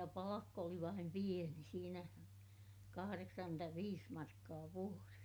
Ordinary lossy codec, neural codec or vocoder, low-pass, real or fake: none; none; none; real